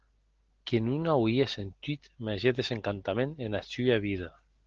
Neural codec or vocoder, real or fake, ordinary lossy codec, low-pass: none; real; Opus, 16 kbps; 7.2 kHz